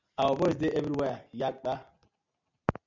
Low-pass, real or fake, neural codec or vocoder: 7.2 kHz; real; none